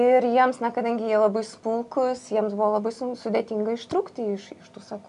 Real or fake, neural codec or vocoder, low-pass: real; none; 10.8 kHz